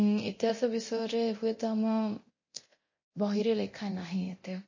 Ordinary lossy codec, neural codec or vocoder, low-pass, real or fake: MP3, 32 kbps; codec, 24 kHz, 0.9 kbps, DualCodec; 7.2 kHz; fake